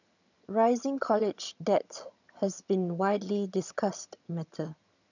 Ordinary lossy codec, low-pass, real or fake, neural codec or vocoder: none; 7.2 kHz; fake; vocoder, 22.05 kHz, 80 mel bands, HiFi-GAN